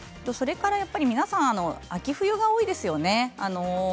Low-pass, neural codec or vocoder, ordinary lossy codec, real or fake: none; none; none; real